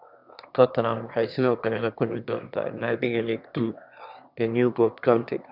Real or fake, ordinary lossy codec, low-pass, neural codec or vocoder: fake; none; 5.4 kHz; autoencoder, 22.05 kHz, a latent of 192 numbers a frame, VITS, trained on one speaker